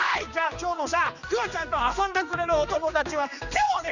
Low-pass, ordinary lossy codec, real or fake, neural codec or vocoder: 7.2 kHz; none; fake; codec, 16 kHz, 2 kbps, X-Codec, HuBERT features, trained on general audio